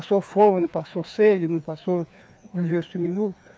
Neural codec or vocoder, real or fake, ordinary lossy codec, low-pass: codec, 16 kHz, 2 kbps, FreqCodec, larger model; fake; none; none